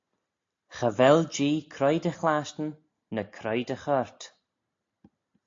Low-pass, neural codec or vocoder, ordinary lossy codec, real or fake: 7.2 kHz; none; AAC, 48 kbps; real